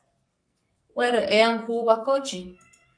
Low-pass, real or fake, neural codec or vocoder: 9.9 kHz; fake; codec, 44.1 kHz, 2.6 kbps, SNAC